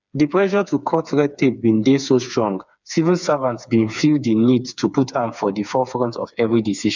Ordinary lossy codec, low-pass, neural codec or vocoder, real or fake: none; 7.2 kHz; codec, 16 kHz, 4 kbps, FreqCodec, smaller model; fake